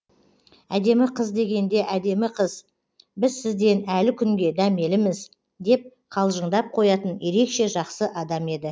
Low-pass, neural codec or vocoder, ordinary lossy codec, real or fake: none; none; none; real